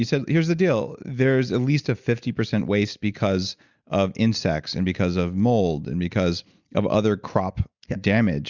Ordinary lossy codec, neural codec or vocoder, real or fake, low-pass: Opus, 64 kbps; none; real; 7.2 kHz